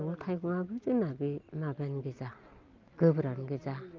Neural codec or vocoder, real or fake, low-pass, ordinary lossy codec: none; real; 7.2 kHz; Opus, 24 kbps